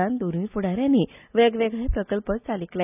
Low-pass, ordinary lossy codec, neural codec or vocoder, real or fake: 3.6 kHz; none; none; real